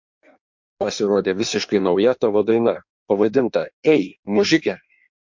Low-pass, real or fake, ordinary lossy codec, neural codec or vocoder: 7.2 kHz; fake; MP3, 48 kbps; codec, 16 kHz in and 24 kHz out, 1.1 kbps, FireRedTTS-2 codec